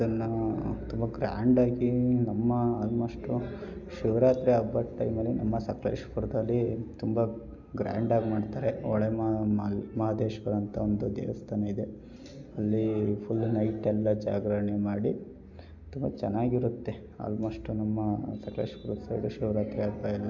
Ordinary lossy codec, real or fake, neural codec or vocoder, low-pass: none; real; none; 7.2 kHz